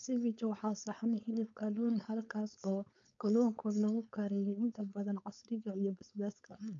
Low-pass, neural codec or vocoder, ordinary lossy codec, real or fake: 7.2 kHz; codec, 16 kHz, 4.8 kbps, FACodec; none; fake